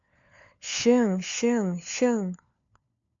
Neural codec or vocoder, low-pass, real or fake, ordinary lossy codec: codec, 16 kHz, 4 kbps, FunCodec, trained on LibriTTS, 50 frames a second; 7.2 kHz; fake; MP3, 64 kbps